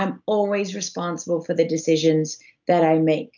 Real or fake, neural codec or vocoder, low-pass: real; none; 7.2 kHz